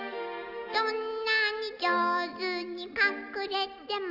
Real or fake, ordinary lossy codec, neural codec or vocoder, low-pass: real; none; none; 5.4 kHz